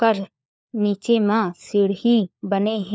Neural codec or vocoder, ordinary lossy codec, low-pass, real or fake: codec, 16 kHz, 4 kbps, FunCodec, trained on LibriTTS, 50 frames a second; none; none; fake